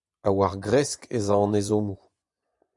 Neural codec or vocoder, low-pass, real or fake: vocoder, 24 kHz, 100 mel bands, Vocos; 10.8 kHz; fake